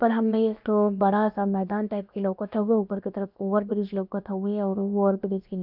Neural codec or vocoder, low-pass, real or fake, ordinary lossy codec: codec, 16 kHz, about 1 kbps, DyCAST, with the encoder's durations; 5.4 kHz; fake; none